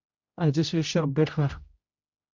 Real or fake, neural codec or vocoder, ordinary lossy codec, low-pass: fake; codec, 16 kHz, 0.5 kbps, X-Codec, HuBERT features, trained on general audio; Opus, 64 kbps; 7.2 kHz